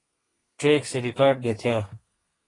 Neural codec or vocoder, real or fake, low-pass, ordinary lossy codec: codec, 32 kHz, 1.9 kbps, SNAC; fake; 10.8 kHz; AAC, 32 kbps